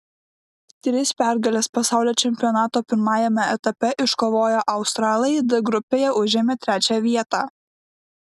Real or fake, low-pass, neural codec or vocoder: real; 14.4 kHz; none